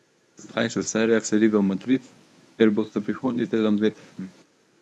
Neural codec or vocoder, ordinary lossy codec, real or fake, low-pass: codec, 24 kHz, 0.9 kbps, WavTokenizer, medium speech release version 1; none; fake; none